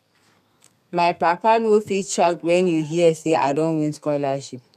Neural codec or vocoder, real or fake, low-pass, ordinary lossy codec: codec, 32 kHz, 1.9 kbps, SNAC; fake; 14.4 kHz; none